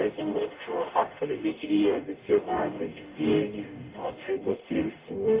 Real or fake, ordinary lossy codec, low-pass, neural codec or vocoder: fake; Opus, 24 kbps; 3.6 kHz; codec, 44.1 kHz, 0.9 kbps, DAC